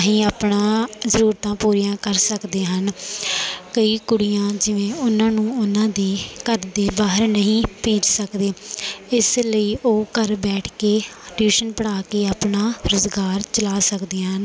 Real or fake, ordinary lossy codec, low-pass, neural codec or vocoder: real; none; none; none